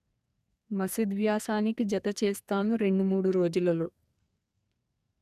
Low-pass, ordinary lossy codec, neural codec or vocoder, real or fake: 14.4 kHz; none; codec, 44.1 kHz, 2.6 kbps, SNAC; fake